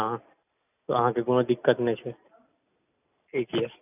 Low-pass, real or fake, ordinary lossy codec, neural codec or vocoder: 3.6 kHz; real; none; none